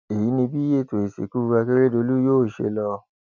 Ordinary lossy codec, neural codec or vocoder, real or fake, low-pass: none; none; real; 7.2 kHz